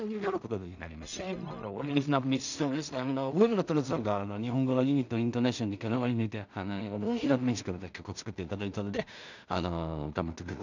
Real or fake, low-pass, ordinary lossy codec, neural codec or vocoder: fake; 7.2 kHz; none; codec, 16 kHz in and 24 kHz out, 0.4 kbps, LongCat-Audio-Codec, two codebook decoder